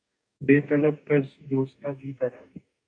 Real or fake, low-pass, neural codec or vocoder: fake; 9.9 kHz; codec, 44.1 kHz, 2.6 kbps, DAC